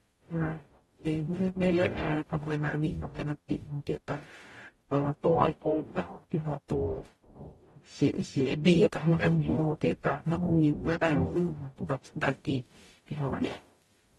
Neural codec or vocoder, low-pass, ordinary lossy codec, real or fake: codec, 44.1 kHz, 0.9 kbps, DAC; 19.8 kHz; AAC, 32 kbps; fake